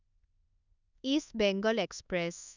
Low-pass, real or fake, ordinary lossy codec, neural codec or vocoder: 7.2 kHz; fake; none; codec, 24 kHz, 1.2 kbps, DualCodec